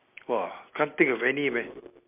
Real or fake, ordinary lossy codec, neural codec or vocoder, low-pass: real; MP3, 32 kbps; none; 3.6 kHz